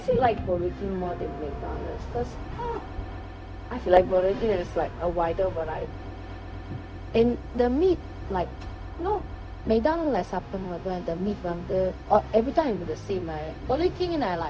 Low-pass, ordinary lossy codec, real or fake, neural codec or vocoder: none; none; fake; codec, 16 kHz, 0.4 kbps, LongCat-Audio-Codec